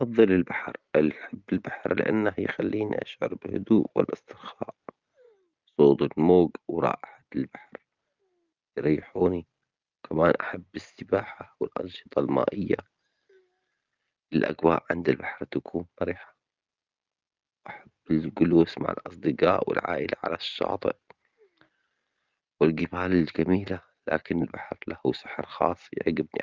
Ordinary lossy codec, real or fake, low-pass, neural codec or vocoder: Opus, 24 kbps; real; 7.2 kHz; none